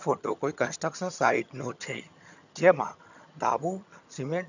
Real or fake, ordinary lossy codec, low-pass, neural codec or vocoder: fake; none; 7.2 kHz; vocoder, 22.05 kHz, 80 mel bands, HiFi-GAN